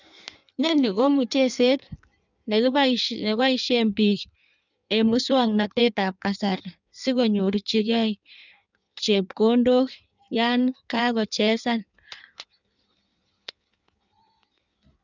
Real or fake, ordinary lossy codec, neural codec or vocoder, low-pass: fake; none; codec, 16 kHz in and 24 kHz out, 1.1 kbps, FireRedTTS-2 codec; 7.2 kHz